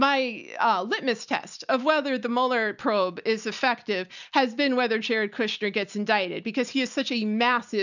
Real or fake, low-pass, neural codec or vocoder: real; 7.2 kHz; none